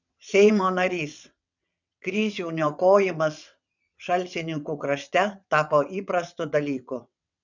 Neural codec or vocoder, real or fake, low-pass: vocoder, 22.05 kHz, 80 mel bands, WaveNeXt; fake; 7.2 kHz